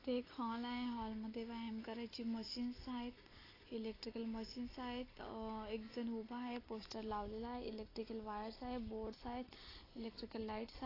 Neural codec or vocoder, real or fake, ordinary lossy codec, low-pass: none; real; AAC, 24 kbps; 5.4 kHz